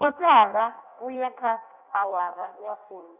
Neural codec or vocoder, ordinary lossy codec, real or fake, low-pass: codec, 16 kHz in and 24 kHz out, 0.6 kbps, FireRedTTS-2 codec; none; fake; 3.6 kHz